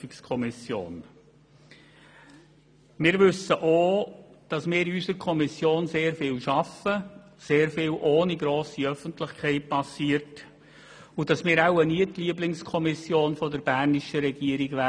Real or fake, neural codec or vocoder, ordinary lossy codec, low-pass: real; none; none; 9.9 kHz